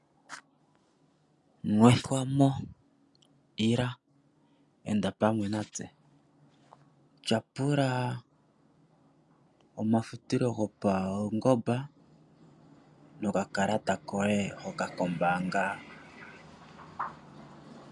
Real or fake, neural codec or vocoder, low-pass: real; none; 10.8 kHz